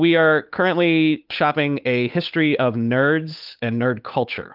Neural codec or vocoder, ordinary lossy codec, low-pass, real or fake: codec, 16 kHz, 8 kbps, FunCodec, trained on Chinese and English, 25 frames a second; Opus, 24 kbps; 5.4 kHz; fake